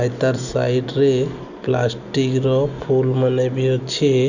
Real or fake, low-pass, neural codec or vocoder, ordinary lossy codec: real; 7.2 kHz; none; none